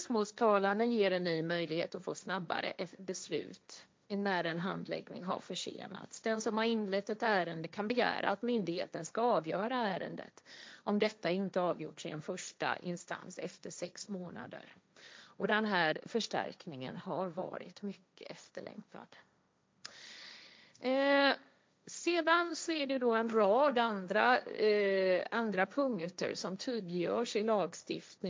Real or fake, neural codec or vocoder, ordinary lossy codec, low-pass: fake; codec, 16 kHz, 1.1 kbps, Voila-Tokenizer; none; none